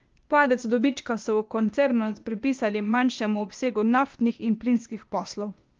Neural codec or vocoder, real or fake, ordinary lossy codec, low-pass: codec, 16 kHz, 0.8 kbps, ZipCodec; fake; Opus, 24 kbps; 7.2 kHz